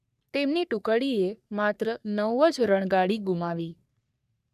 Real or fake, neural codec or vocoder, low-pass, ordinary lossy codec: fake; codec, 44.1 kHz, 3.4 kbps, Pupu-Codec; 14.4 kHz; none